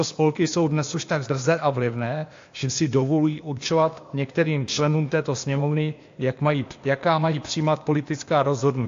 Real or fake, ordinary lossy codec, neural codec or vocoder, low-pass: fake; AAC, 48 kbps; codec, 16 kHz, 0.8 kbps, ZipCodec; 7.2 kHz